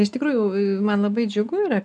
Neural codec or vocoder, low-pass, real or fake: none; 10.8 kHz; real